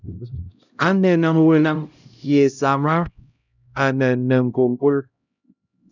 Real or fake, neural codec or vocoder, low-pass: fake; codec, 16 kHz, 0.5 kbps, X-Codec, HuBERT features, trained on LibriSpeech; 7.2 kHz